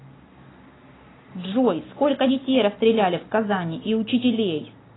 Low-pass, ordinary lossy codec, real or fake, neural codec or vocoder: 7.2 kHz; AAC, 16 kbps; real; none